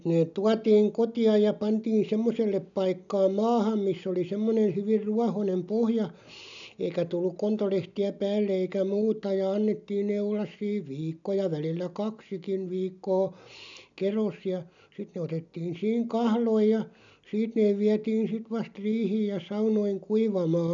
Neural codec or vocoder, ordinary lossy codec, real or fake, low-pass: none; none; real; 7.2 kHz